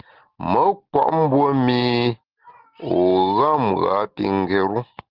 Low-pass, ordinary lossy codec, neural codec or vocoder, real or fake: 5.4 kHz; Opus, 16 kbps; none; real